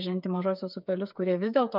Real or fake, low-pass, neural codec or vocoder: fake; 5.4 kHz; codec, 16 kHz, 8 kbps, FreqCodec, smaller model